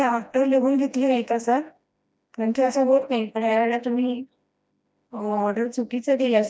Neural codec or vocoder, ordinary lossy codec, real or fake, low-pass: codec, 16 kHz, 1 kbps, FreqCodec, smaller model; none; fake; none